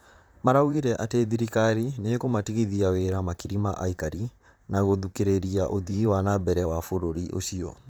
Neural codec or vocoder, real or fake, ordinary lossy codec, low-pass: vocoder, 44.1 kHz, 128 mel bands, Pupu-Vocoder; fake; none; none